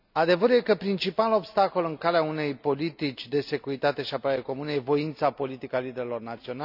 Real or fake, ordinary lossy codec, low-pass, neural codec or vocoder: real; none; 5.4 kHz; none